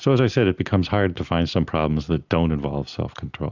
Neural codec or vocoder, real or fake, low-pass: none; real; 7.2 kHz